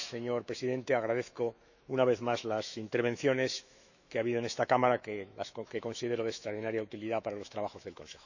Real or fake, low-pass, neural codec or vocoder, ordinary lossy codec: fake; 7.2 kHz; autoencoder, 48 kHz, 128 numbers a frame, DAC-VAE, trained on Japanese speech; none